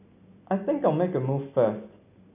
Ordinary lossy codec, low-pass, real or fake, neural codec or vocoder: none; 3.6 kHz; real; none